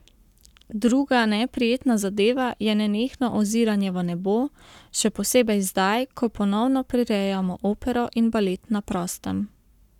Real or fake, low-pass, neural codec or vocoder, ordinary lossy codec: fake; 19.8 kHz; codec, 44.1 kHz, 7.8 kbps, Pupu-Codec; none